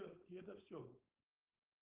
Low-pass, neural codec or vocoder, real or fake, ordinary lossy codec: 3.6 kHz; codec, 16 kHz, 4.8 kbps, FACodec; fake; Opus, 32 kbps